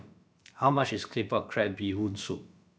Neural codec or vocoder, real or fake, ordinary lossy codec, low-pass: codec, 16 kHz, about 1 kbps, DyCAST, with the encoder's durations; fake; none; none